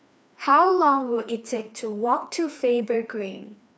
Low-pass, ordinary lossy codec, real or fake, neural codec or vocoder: none; none; fake; codec, 16 kHz, 2 kbps, FreqCodec, larger model